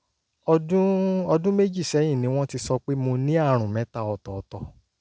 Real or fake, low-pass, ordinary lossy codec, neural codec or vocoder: real; none; none; none